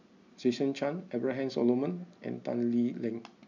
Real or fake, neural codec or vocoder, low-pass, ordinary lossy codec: real; none; 7.2 kHz; none